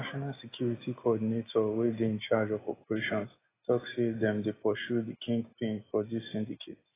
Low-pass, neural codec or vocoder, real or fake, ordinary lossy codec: 3.6 kHz; none; real; AAC, 16 kbps